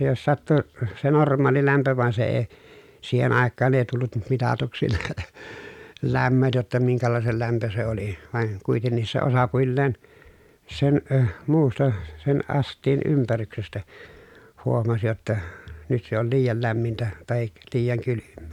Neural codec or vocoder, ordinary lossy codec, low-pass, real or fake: none; none; 19.8 kHz; real